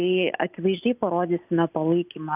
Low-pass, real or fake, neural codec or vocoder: 3.6 kHz; real; none